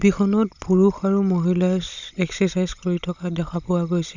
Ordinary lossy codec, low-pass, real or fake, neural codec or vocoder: none; 7.2 kHz; fake; codec, 16 kHz, 16 kbps, FunCodec, trained on Chinese and English, 50 frames a second